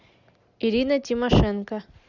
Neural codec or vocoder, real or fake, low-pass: none; real; 7.2 kHz